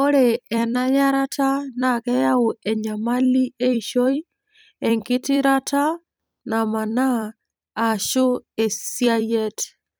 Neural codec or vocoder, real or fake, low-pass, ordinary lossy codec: vocoder, 44.1 kHz, 128 mel bands every 256 samples, BigVGAN v2; fake; none; none